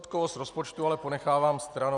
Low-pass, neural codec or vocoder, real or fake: 10.8 kHz; none; real